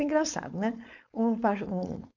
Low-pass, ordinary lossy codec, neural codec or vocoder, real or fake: 7.2 kHz; none; codec, 16 kHz, 4.8 kbps, FACodec; fake